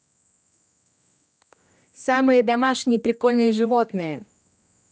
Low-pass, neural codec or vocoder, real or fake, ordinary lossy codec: none; codec, 16 kHz, 1 kbps, X-Codec, HuBERT features, trained on general audio; fake; none